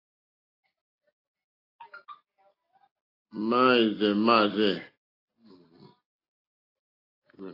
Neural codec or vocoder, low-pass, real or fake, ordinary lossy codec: none; 5.4 kHz; real; AAC, 24 kbps